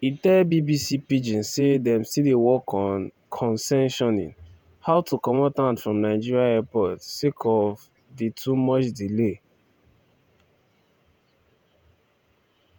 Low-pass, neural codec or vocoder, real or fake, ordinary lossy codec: none; vocoder, 48 kHz, 128 mel bands, Vocos; fake; none